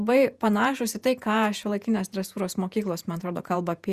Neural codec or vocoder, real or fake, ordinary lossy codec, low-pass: vocoder, 48 kHz, 128 mel bands, Vocos; fake; Opus, 64 kbps; 14.4 kHz